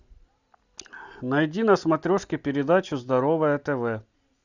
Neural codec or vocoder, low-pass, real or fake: none; 7.2 kHz; real